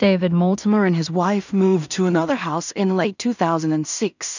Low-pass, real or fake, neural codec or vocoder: 7.2 kHz; fake; codec, 16 kHz in and 24 kHz out, 0.4 kbps, LongCat-Audio-Codec, two codebook decoder